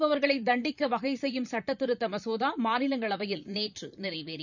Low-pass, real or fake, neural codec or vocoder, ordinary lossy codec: 7.2 kHz; fake; vocoder, 44.1 kHz, 128 mel bands, Pupu-Vocoder; none